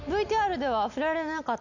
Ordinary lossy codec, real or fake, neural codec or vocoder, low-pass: none; real; none; 7.2 kHz